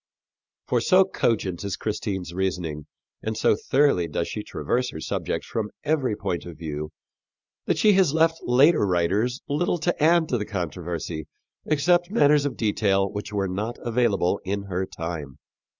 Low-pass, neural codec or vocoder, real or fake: 7.2 kHz; none; real